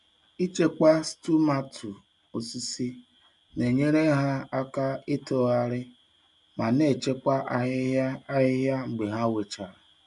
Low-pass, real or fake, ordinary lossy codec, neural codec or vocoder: 10.8 kHz; real; none; none